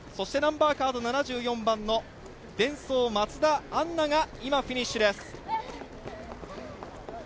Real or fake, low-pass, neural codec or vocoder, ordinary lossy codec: real; none; none; none